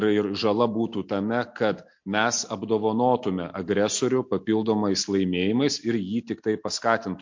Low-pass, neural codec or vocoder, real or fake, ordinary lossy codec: 7.2 kHz; none; real; MP3, 48 kbps